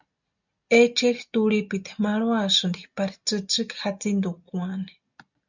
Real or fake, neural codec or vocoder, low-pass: real; none; 7.2 kHz